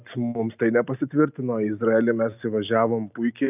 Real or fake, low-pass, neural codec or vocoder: real; 3.6 kHz; none